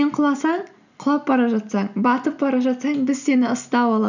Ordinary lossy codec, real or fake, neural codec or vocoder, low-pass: none; real; none; 7.2 kHz